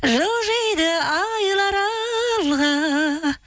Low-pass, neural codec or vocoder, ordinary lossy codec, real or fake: none; none; none; real